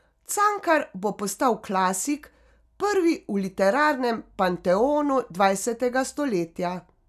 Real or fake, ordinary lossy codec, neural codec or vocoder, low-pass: real; none; none; 14.4 kHz